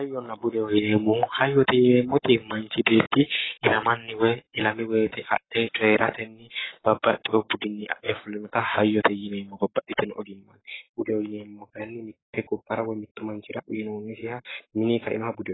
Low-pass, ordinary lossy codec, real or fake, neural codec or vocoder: 7.2 kHz; AAC, 16 kbps; fake; codec, 44.1 kHz, 7.8 kbps, Pupu-Codec